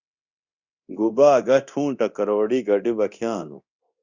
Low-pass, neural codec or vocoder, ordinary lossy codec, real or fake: 7.2 kHz; codec, 24 kHz, 0.9 kbps, DualCodec; Opus, 64 kbps; fake